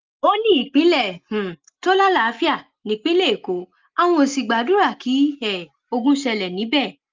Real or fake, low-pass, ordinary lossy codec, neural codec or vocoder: real; 7.2 kHz; Opus, 32 kbps; none